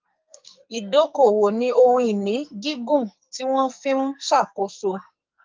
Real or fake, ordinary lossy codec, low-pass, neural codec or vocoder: fake; Opus, 24 kbps; 7.2 kHz; codec, 44.1 kHz, 2.6 kbps, SNAC